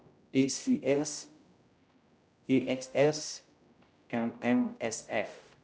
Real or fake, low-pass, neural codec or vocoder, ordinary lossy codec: fake; none; codec, 16 kHz, 0.5 kbps, X-Codec, HuBERT features, trained on general audio; none